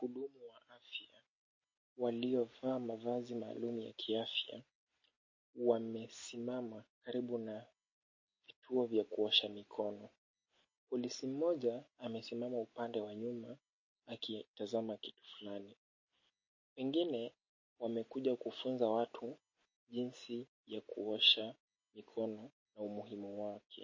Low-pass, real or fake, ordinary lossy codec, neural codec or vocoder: 7.2 kHz; real; MP3, 32 kbps; none